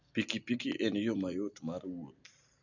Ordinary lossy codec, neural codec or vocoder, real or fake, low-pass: none; none; real; 7.2 kHz